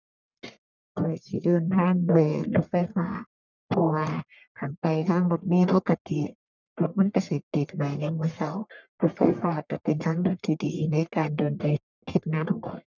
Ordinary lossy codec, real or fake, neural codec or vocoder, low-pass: none; fake; codec, 44.1 kHz, 1.7 kbps, Pupu-Codec; 7.2 kHz